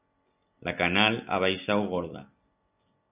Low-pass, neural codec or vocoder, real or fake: 3.6 kHz; none; real